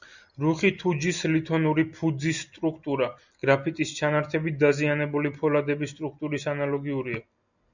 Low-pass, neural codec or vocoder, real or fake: 7.2 kHz; none; real